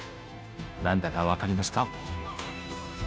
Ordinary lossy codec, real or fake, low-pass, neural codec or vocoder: none; fake; none; codec, 16 kHz, 0.5 kbps, FunCodec, trained on Chinese and English, 25 frames a second